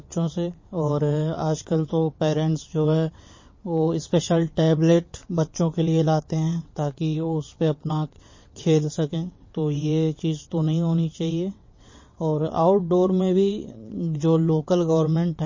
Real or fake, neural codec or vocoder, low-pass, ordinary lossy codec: fake; vocoder, 22.05 kHz, 80 mel bands, WaveNeXt; 7.2 kHz; MP3, 32 kbps